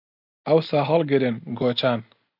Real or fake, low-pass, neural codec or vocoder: real; 5.4 kHz; none